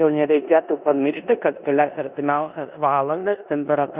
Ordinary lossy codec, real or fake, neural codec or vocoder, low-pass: Opus, 64 kbps; fake; codec, 16 kHz in and 24 kHz out, 0.9 kbps, LongCat-Audio-Codec, four codebook decoder; 3.6 kHz